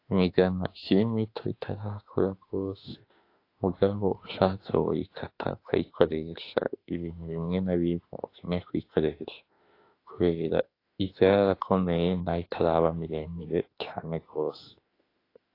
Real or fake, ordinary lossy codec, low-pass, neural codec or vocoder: fake; AAC, 32 kbps; 5.4 kHz; autoencoder, 48 kHz, 32 numbers a frame, DAC-VAE, trained on Japanese speech